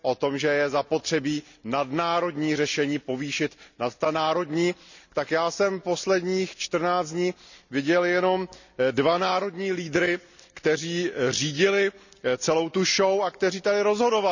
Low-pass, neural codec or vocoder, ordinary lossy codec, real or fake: 7.2 kHz; none; none; real